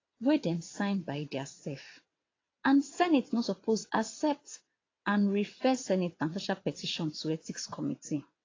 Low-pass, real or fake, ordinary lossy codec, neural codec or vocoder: 7.2 kHz; fake; AAC, 32 kbps; vocoder, 22.05 kHz, 80 mel bands, WaveNeXt